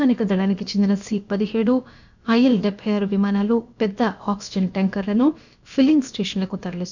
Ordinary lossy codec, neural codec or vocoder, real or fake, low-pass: none; codec, 16 kHz, about 1 kbps, DyCAST, with the encoder's durations; fake; 7.2 kHz